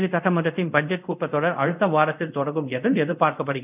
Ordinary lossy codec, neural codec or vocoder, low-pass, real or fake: none; codec, 24 kHz, 0.5 kbps, DualCodec; 3.6 kHz; fake